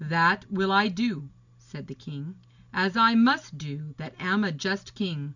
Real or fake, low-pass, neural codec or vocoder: real; 7.2 kHz; none